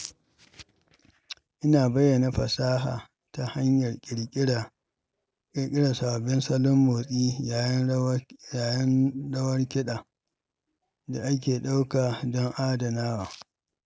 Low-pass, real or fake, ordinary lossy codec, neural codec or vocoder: none; real; none; none